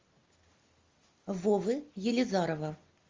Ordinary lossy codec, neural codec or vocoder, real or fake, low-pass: Opus, 32 kbps; none; real; 7.2 kHz